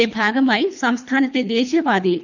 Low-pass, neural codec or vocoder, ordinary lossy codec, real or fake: 7.2 kHz; codec, 24 kHz, 3 kbps, HILCodec; none; fake